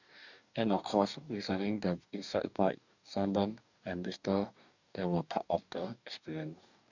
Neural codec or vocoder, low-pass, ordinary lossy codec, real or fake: codec, 44.1 kHz, 2.6 kbps, DAC; 7.2 kHz; none; fake